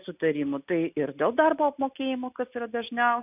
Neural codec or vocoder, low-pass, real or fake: none; 3.6 kHz; real